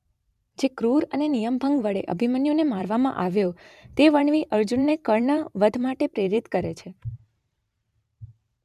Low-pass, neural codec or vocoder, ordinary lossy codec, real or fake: 14.4 kHz; vocoder, 44.1 kHz, 128 mel bands every 512 samples, BigVGAN v2; none; fake